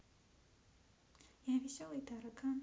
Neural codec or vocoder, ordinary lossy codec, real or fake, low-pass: none; none; real; none